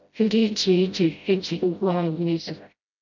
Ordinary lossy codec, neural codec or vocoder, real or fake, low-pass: MP3, 64 kbps; codec, 16 kHz, 0.5 kbps, FreqCodec, smaller model; fake; 7.2 kHz